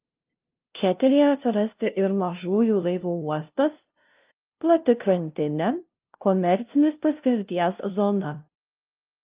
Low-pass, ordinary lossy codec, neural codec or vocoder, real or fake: 3.6 kHz; Opus, 24 kbps; codec, 16 kHz, 0.5 kbps, FunCodec, trained on LibriTTS, 25 frames a second; fake